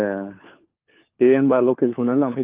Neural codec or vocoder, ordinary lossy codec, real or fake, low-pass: codec, 16 kHz, 4 kbps, FunCodec, trained on LibriTTS, 50 frames a second; Opus, 32 kbps; fake; 3.6 kHz